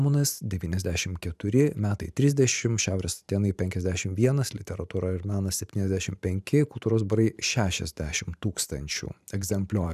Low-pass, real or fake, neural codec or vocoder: 14.4 kHz; fake; vocoder, 44.1 kHz, 128 mel bands every 512 samples, BigVGAN v2